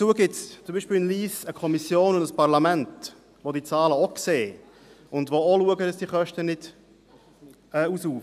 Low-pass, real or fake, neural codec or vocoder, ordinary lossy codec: 14.4 kHz; real; none; none